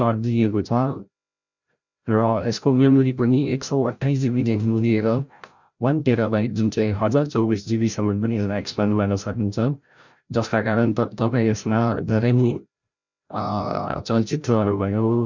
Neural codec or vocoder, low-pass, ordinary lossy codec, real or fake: codec, 16 kHz, 0.5 kbps, FreqCodec, larger model; 7.2 kHz; none; fake